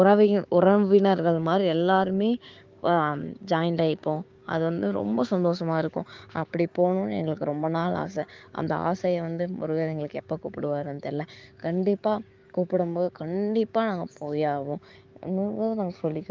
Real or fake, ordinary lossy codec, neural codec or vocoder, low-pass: fake; Opus, 32 kbps; codec, 16 kHz, 6 kbps, DAC; 7.2 kHz